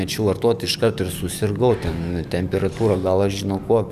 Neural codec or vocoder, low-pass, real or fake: codec, 44.1 kHz, 7.8 kbps, DAC; 14.4 kHz; fake